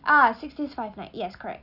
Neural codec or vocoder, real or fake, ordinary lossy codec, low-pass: none; real; none; 5.4 kHz